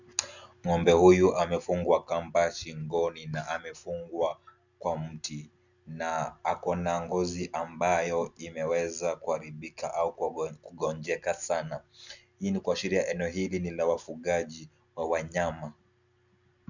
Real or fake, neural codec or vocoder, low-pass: real; none; 7.2 kHz